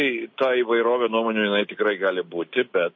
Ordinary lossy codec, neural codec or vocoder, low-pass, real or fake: MP3, 32 kbps; none; 7.2 kHz; real